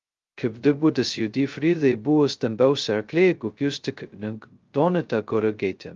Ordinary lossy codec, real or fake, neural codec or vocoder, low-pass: Opus, 32 kbps; fake; codec, 16 kHz, 0.2 kbps, FocalCodec; 7.2 kHz